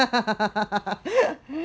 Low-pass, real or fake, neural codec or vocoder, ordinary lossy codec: none; real; none; none